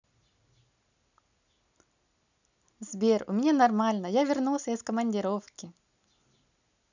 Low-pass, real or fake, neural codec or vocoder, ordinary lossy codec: 7.2 kHz; real; none; none